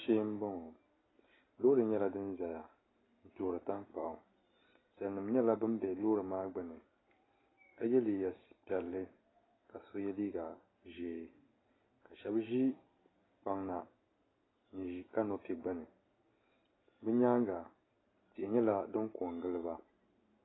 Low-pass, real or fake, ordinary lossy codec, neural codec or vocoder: 7.2 kHz; real; AAC, 16 kbps; none